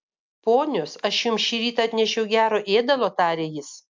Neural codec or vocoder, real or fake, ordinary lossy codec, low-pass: none; real; MP3, 64 kbps; 7.2 kHz